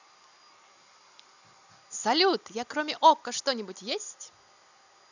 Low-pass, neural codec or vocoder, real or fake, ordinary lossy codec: 7.2 kHz; none; real; none